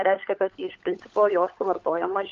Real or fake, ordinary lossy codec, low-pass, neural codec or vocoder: fake; Opus, 24 kbps; 7.2 kHz; codec, 16 kHz, 16 kbps, FunCodec, trained on LibriTTS, 50 frames a second